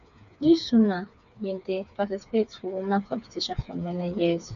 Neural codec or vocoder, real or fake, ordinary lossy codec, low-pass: codec, 16 kHz, 4 kbps, FreqCodec, smaller model; fake; none; 7.2 kHz